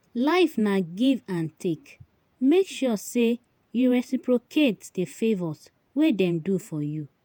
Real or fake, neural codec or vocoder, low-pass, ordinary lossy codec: fake; vocoder, 48 kHz, 128 mel bands, Vocos; none; none